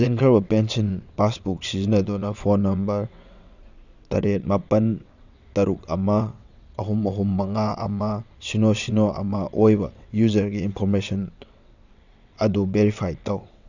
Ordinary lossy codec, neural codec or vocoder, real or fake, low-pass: none; vocoder, 22.05 kHz, 80 mel bands, WaveNeXt; fake; 7.2 kHz